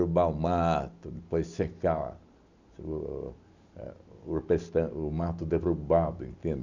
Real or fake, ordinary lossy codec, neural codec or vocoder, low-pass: real; none; none; 7.2 kHz